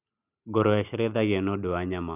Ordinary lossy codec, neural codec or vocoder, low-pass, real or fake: none; none; 3.6 kHz; real